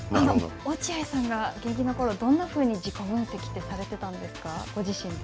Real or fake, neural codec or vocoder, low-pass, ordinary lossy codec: real; none; none; none